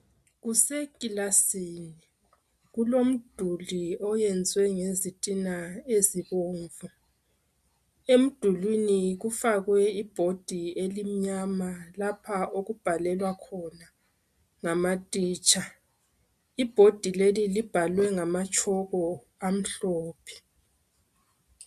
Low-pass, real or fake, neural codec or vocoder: 14.4 kHz; real; none